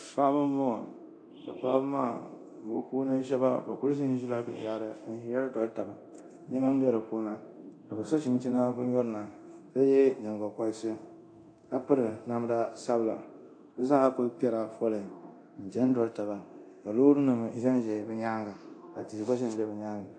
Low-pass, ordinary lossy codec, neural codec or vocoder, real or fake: 9.9 kHz; AAC, 48 kbps; codec, 24 kHz, 0.9 kbps, DualCodec; fake